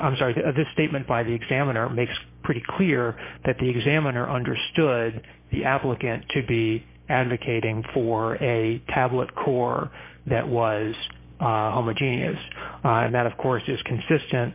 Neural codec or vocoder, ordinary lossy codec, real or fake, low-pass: codec, 16 kHz, 6 kbps, DAC; MP3, 24 kbps; fake; 3.6 kHz